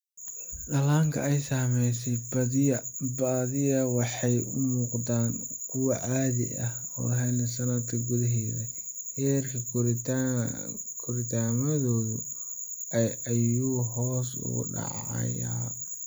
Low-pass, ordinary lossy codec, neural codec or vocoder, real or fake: none; none; none; real